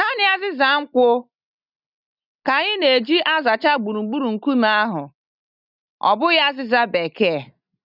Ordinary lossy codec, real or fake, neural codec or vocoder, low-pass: none; real; none; 5.4 kHz